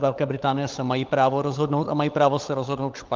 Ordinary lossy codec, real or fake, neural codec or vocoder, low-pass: Opus, 32 kbps; fake; codec, 16 kHz, 16 kbps, FunCodec, trained on LibriTTS, 50 frames a second; 7.2 kHz